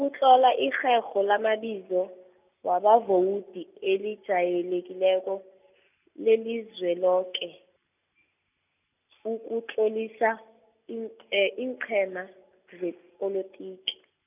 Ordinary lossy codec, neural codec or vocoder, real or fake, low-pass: none; none; real; 3.6 kHz